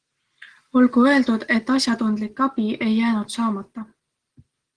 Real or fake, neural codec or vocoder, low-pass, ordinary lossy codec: real; none; 9.9 kHz; Opus, 16 kbps